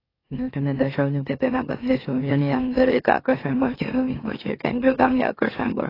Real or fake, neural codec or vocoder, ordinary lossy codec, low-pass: fake; autoencoder, 44.1 kHz, a latent of 192 numbers a frame, MeloTTS; AAC, 24 kbps; 5.4 kHz